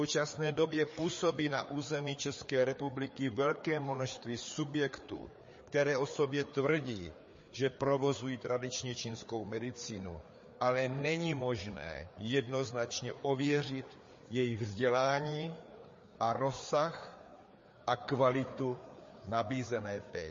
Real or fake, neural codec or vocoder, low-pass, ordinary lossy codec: fake; codec, 16 kHz, 4 kbps, FreqCodec, larger model; 7.2 kHz; MP3, 32 kbps